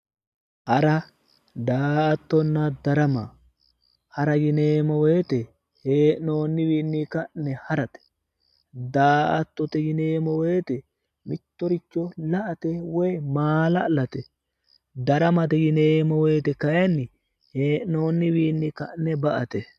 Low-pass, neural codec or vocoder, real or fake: 14.4 kHz; none; real